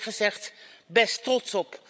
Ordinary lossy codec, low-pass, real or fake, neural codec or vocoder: none; none; fake; codec, 16 kHz, 16 kbps, FreqCodec, larger model